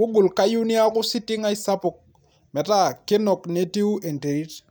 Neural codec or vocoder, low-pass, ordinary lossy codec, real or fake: none; none; none; real